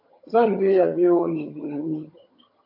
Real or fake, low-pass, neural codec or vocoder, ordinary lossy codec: fake; 5.4 kHz; vocoder, 22.05 kHz, 80 mel bands, HiFi-GAN; AAC, 32 kbps